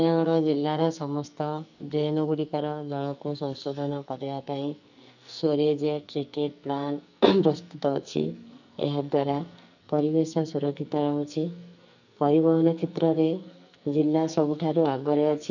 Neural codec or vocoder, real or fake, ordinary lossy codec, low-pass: codec, 44.1 kHz, 2.6 kbps, SNAC; fake; none; 7.2 kHz